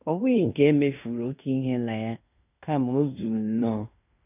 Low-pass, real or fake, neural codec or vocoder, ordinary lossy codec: 3.6 kHz; fake; codec, 16 kHz in and 24 kHz out, 0.9 kbps, LongCat-Audio-Codec, fine tuned four codebook decoder; none